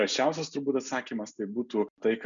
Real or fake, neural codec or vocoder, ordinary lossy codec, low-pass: real; none; MP3, 96 kbps; 7.2 kHz